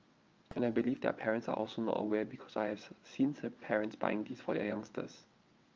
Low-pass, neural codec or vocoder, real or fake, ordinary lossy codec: 7.2 kHz; vocoder, 22.05 kHz, 80 mel bands, WaveNeXt; fake; Opus, 24 kbps